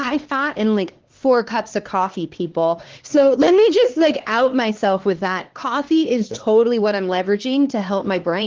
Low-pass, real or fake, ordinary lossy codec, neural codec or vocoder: 7.2 kHz; fake; Opus, 16 kbps; codec, 16 kHz, 2 kbps, X-Codec, HuBERT features, trained on LibriSpeech